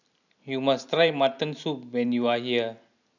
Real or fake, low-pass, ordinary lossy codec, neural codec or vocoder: real; 7.2 kHz; none; none